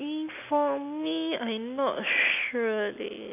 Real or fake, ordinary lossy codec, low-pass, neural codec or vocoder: real; none; 3.6 kHz; none